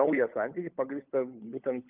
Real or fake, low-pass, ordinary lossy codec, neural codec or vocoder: fake; 3.6 kHz; Opus, 24 kbps; codec, 16 kHz, 16 kbps, FunCodec, trained on LibriTTS, 50 frames a second